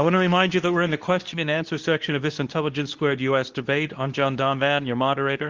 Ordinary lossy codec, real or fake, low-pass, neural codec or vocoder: Opus, 32 kbps; fake; 7.2 kHz; codec, 24 kHz, 0.9 kbps, WavTokenizer, medium speech release version 2